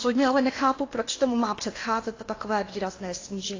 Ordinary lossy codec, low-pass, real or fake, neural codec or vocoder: AAC, 32 kbps; 7.2 kHz; fake; codec, 16 kHz in and 24 kHz out, 0.8 kbps, FocalCodec, streaming, 65536 codes